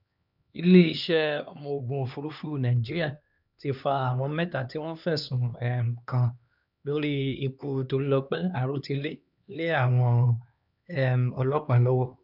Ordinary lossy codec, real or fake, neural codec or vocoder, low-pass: none; fake; codec, 16 kHz, 2 kbps, X-Codec, HuBERT features, trained on LibriSpeech; 5.4 kHz